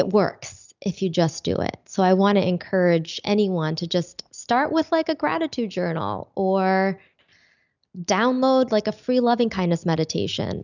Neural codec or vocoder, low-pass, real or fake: none; 7.2 kHz; real